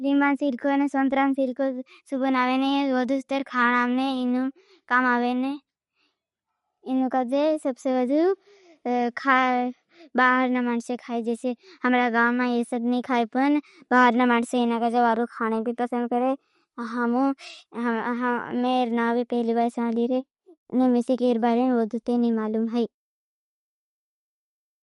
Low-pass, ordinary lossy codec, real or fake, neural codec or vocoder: 19.8 kHz; MP3, 48 kbps; real; none